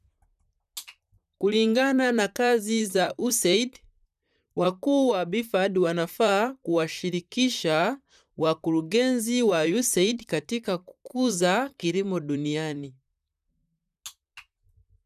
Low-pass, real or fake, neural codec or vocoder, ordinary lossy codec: 14.4 kHz; fake; vocoder, 44.1 kHz, 128 mel bands, Pupu-Vocoder; none